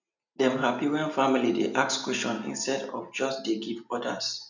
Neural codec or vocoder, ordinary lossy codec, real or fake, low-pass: none; none; real; 7.2 kHz